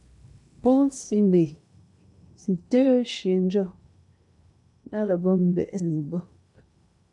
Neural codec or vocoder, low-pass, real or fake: codec, 16 kHz in and 24 kHz out, 0.8 kbps, FocalCodec, streaming, 65536 codes; 10.8 kHz; fake